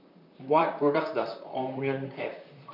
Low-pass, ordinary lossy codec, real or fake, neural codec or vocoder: 5.4 kHz; none; fake; vocoder, 44.1 kHz, 128 mel bands, Pupu-Vocoder